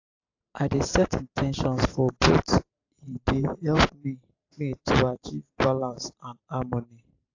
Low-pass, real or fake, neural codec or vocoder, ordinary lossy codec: 7.2 kHz; fake; vocoder, 44.1 kHz, 128 mel bands every 512 samples, BigVGAN v2; AAC, 48 kbps